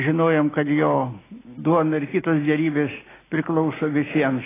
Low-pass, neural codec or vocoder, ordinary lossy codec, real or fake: 3.6 kHz; none; AAC, 16 kbps; real